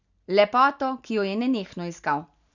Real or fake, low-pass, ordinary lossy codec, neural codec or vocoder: real; 7.2 kHz; none; none